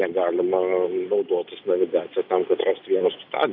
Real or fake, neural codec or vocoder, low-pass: real; none; 5.4 kHz